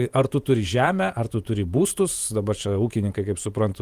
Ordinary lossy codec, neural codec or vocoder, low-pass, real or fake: Opus, 32 kbps; vocoder, 48 kHz, 128 mel bands, Vocos; 14.4 kHz; fake